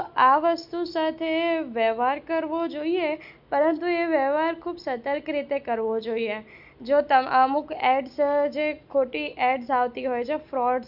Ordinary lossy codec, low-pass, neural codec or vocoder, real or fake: none; 5.4 kHz; none; real